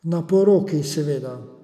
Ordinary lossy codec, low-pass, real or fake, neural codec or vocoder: none; 14.4 kHz; real; none